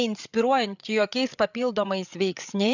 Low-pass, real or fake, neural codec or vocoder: 7.2 kHz; fake; codec, 16 kHz, 8 kbps, FreqCodec, larger model